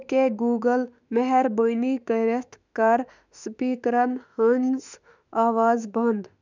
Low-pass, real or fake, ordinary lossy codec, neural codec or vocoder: 7.2 kHz; fake; none; autoencoder, 48 kHz, 32 numbers a frame, DAC-VAE, trained on Japanese speech